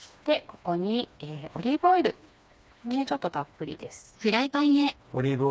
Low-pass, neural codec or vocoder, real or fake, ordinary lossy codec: none; codec, 16 kHz, 2 kbps, FreqCodec, smaller model; fake; none